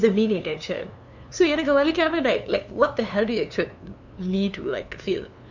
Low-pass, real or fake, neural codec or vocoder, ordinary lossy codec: 7.2 kHz; fake; codec, 16 kHz, 2 kbps, FunCodec, trained on LibriTTS, 25 frames a second; none